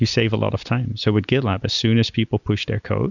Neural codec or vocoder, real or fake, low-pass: none; real; 7.2 kHz